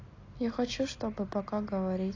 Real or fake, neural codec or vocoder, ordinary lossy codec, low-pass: real; none; AAC, 32 kbps; 7.2 kHz